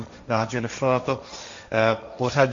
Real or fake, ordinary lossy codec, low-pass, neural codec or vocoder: fake; AAC, 64 kbps; 7.2 kHz; codec, 16 kHz, 1.1 kbps, Voila-Tokenizer